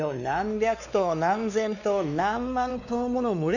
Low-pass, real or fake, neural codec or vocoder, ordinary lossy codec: 7.2 kHz; fake; codec, 16 kHz, 2 kbps, X-Codec, WavLM features, trained on Multilingual LibriSpeech; none